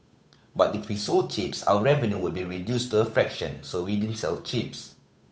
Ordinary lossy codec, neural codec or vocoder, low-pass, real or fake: none; codec, 16 kHz, 8 kbps, FunCodec, trained on Chinese and English, 25 frames a second; none; fake